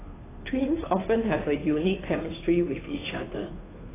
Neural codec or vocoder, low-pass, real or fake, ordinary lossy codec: codec, 16 kHz, 2 kbps, FunCodec, trained on Chinese and English, 25 frames a second; 3.6 kHz; fake; AAC, 16 kbps